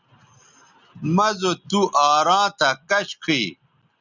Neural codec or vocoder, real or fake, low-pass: none; real; 7.2 kHz